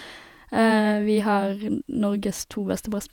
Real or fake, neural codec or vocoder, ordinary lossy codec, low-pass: fake; vocoder, 48 kHz, 128 mel bands, Vocos; none; 19.8 kHz